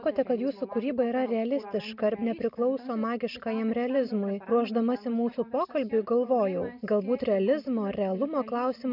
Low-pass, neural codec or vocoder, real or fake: 5.4 kHz; none; real